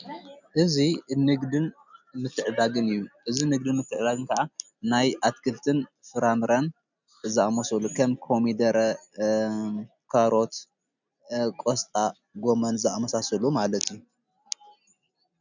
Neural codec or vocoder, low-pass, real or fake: none; 7.2 kHz; real